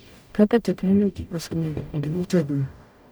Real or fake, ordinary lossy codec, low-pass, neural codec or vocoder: fake; none; none; codec, 44.1 kHz, 0.9 kbps, DAC